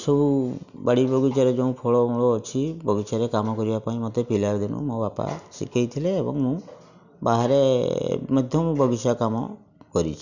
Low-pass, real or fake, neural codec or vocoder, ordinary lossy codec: 7.2 kHz; real; none; none